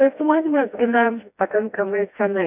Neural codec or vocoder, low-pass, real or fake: codec, 16 kHz, 1 kbps, FreqCodec, smaller model; 3.6 kHz; fake